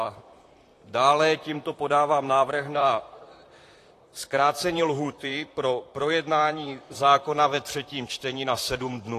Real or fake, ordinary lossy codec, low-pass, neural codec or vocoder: fake; AAC, 48 kbps; 14.4 kHz; vocoder, 44.1 kHz, 128 mel bands, Pupu-Vocoder